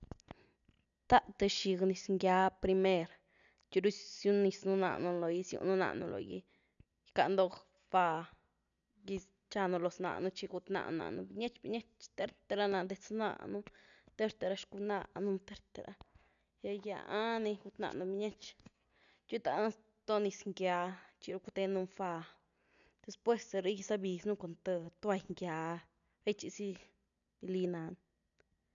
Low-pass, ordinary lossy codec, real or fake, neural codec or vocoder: 7.2 kHz; none; real; none